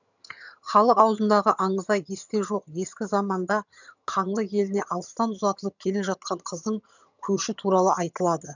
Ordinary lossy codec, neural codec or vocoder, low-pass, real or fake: none; vocoder, 22.05 kHz, 80 mel bands, HiFi-GAN; 7.2 kHz; fake